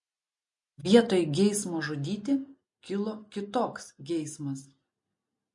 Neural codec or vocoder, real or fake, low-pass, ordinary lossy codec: none; real; 10.8 kHz; MP3, 48 kbps